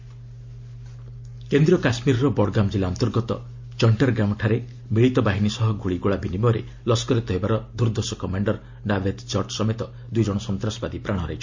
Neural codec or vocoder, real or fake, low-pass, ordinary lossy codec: none; real; 7.2 kHz; MP3, 48 kbps